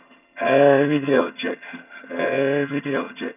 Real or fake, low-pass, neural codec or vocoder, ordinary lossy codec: fake; 3.6 kHz; vocoder, 22.05 kHz, 80 mel bands, HiFi-GAN; none